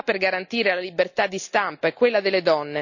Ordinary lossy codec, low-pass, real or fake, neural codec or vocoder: none; 7.2 kHz; real; none